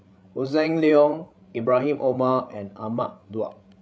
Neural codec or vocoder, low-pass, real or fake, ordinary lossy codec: codec, 16 kHz, 16 kbps, FreqCodec, larger model; none; fake; none